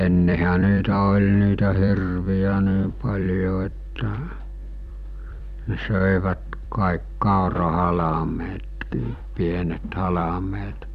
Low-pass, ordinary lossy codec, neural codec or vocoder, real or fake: 14.4 kHz; none; vocoder, 44.1 kHz, 128 mel bands every 256 samples, BigVGAN v2; fake